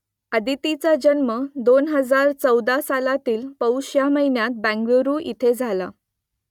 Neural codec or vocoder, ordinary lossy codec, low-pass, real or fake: none; none; 19.8 kHz; real